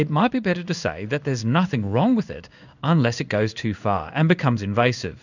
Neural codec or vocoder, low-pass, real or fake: codec, 16 kHz in and 24 kHz out, 1 kbps, XY-Tokenizer; 7.2 kHz; fake